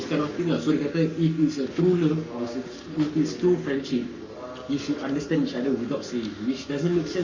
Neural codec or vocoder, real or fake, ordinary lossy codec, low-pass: codec, 44.1 kHz, 7.8 kbps, Pupu-Codec; fake; Opus, 64 kbps; 7.2 kHz